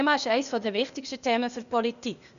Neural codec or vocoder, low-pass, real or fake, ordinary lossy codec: codec, 16 kHz, 0.8 kbps, ZipCodec; 7.2 kHz; fake; none